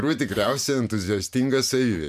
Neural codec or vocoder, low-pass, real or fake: vocoder, 44.1 kHz, 128 mel bands every 512 samples, BigVGAN v2; 14.4 kHz; fake